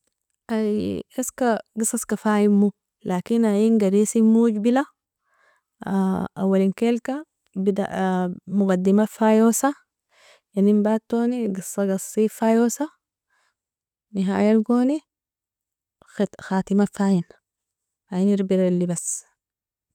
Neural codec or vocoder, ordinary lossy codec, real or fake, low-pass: none; none; real; 19.8 kHz